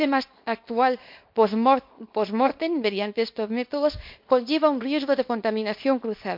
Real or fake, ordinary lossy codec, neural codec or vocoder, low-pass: fake; MP3, 48 kbps; codec, 24 kHz, 0.9 kbps, WavTokenizer, small release; 5.4 kHz